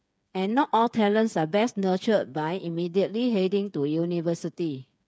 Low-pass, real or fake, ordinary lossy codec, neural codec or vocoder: none; fake; none; codec, 16 kHz, 8 kbps, FreqCodec, smaller model